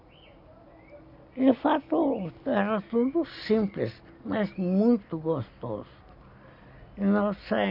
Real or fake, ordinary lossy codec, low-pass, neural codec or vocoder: real; none; 5.4 kHz; none